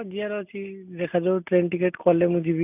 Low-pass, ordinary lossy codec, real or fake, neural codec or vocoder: 3.6 kHz; none; real; none